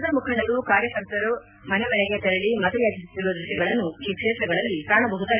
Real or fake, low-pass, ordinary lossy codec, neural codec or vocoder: real; 3.6 kHz; none; none